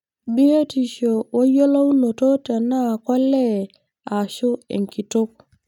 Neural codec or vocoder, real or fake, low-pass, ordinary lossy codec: none; real; 19.8 kHz; none